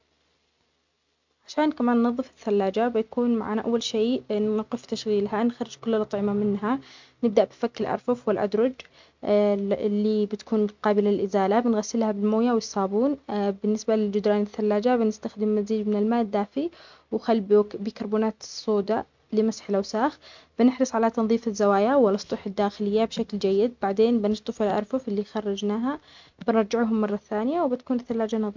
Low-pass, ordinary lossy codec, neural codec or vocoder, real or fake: 7.2 kHz; MP3, 64 kbps; none; real